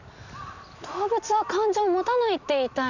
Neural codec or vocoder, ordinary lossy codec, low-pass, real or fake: none; none; 7.2 kHz; real